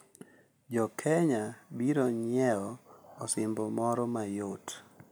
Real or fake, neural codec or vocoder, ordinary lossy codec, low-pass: real; none; none; none